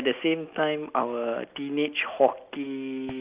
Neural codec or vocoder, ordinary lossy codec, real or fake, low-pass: none; Opus, 24 kbps; real; 3.6 kHz